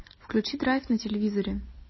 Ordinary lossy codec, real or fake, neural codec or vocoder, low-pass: MP3, 24 kbps; real; none; 7.2 kHz